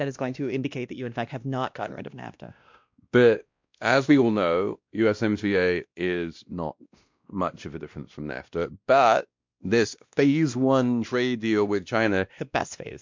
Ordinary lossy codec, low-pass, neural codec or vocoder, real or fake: MP3, 64 kbps; 7.2 kHz; codec, 16 kHz, 1 kbps, X-Codec, WavLM features, trained on Multilingual LibriSpeech; fake